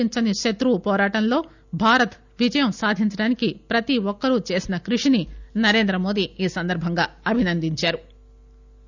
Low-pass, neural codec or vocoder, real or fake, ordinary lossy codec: 7.2 kHz; none; real; none